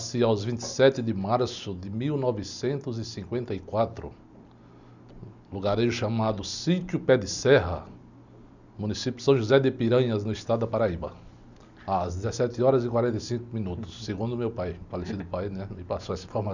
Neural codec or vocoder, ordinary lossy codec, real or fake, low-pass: none; none; real; 7.2 kHz